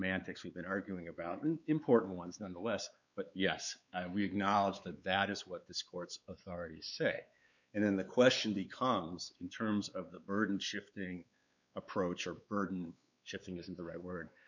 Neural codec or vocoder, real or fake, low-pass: codec, 16 kHz, 4 kbps, X-Codec, WavLM features, trained on Multilingual LibriSpeech; fake; 7.2 kHz